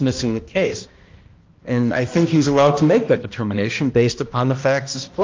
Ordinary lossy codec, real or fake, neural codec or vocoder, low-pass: Opus, 24 kbps; fake; codec, 16 kHz, 1 kbps, X-Codec, HuBERT features, trained on balanced general audio; 7.2 kHz